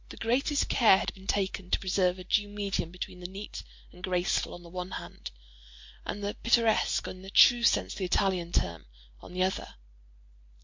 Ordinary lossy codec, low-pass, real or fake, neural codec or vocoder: MP3, 48 kbps; 7.2 kHz; real; none